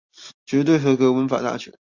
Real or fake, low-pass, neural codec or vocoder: real; 7.2 kHz; none